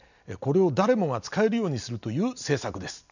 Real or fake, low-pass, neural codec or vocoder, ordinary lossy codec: real; 7.2 kHz; none; none